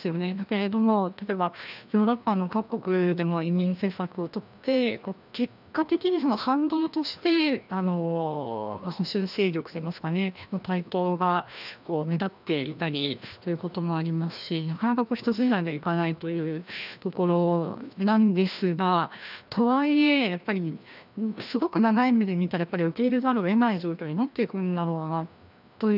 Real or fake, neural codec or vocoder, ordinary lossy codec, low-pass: fake; codec, 16 kHz, 1 kbps, FreqCodec, larger model; none; 5.4 kHz